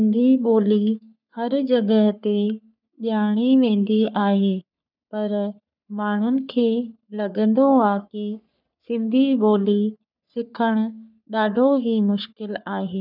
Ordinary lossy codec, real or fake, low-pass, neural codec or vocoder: none; fake; 5.4 kHz; codec, 44.1 kHz, 3.4 kbps, Pupu-Codec